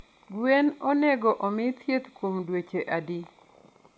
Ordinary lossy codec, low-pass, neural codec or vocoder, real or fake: none; none; none; real